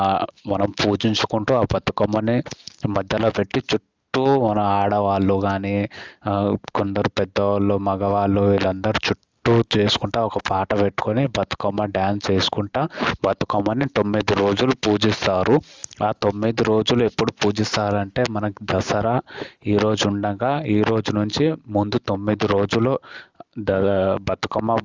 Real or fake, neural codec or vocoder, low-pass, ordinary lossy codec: real; none; 7.2 kHz; Opus, 32 kbps